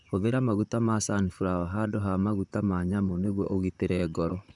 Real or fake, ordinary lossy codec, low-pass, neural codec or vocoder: fake; none; 10.8 kHz; vocoder, 44.1 kHz, 128 mel bands, Pupu-Vocoder